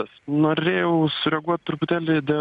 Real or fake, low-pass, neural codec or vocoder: real; 10.8 kHz; none